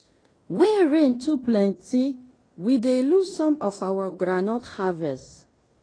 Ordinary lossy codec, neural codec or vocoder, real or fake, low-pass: AAC, 32 kbps; codec, 16 kHz in and 24 kHz out, 0.9 kbps, LongCat-Audio-Codec, fine tuned four codebook decoder; fake; 9.9 kHz